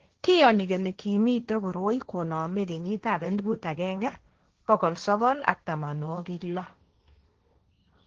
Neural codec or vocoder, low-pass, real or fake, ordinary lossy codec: codec, 16 kHz, 1.1 kbps, Voila-Tokenizer; 7.2 kHz; fake; Opus, 16 kbps